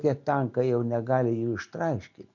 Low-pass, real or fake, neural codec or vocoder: 7.2 kHz; real; none